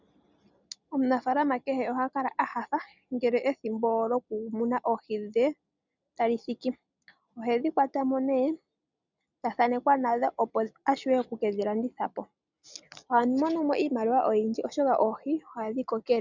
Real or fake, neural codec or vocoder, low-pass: real; none; 7.2 kHz